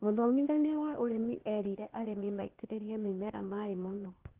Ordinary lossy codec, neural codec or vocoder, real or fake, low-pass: Opus, 16 kbps; codec, 16 kHz, 0.8 kbps, ZipCodec; fake; 3.6 kHz